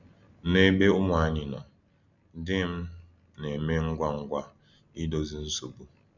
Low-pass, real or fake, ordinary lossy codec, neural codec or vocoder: 7.2 kHz; real; none; none